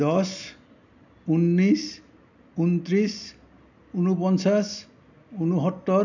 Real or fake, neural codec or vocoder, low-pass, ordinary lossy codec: real; none; 7.2 kHz; none